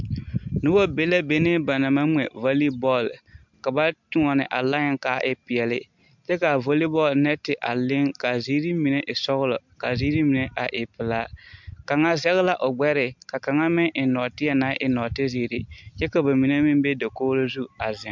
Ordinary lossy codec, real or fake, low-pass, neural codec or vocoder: MP3, 64 kbps; real; 7.2 kHz; none